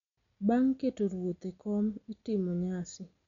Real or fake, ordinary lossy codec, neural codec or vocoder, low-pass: real; none; none; 7.2 kHz